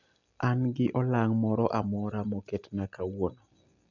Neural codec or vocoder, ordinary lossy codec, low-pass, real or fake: none; none; 7.2 kHz; real